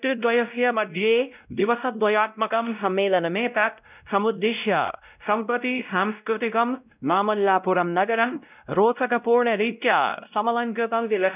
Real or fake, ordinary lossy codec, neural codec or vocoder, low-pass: fake; none; codec, 16 kHz, 0.5 kbps, X-Codec, WavLM features, trained on Multilingual LibriSpeech; 3.6 kHz